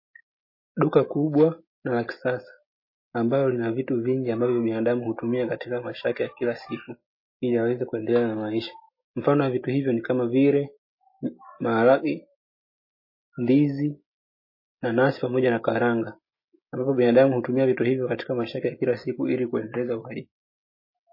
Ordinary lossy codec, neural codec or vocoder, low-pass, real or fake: MP3, 24 kbps; none; 5.4 kHz; real